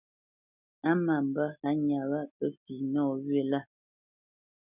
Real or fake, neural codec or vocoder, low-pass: real; none; 3.6 kHz